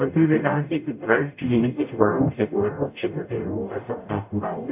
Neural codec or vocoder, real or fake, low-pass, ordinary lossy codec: codec, 44.1 kHz, 0.9 kbps, DAC; fake; 3.6 kHz; AAC, 32 kbps